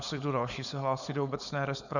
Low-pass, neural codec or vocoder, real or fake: 7.2 kHz; codec, 16 kHz, 4.8 kbps, FACodec; fake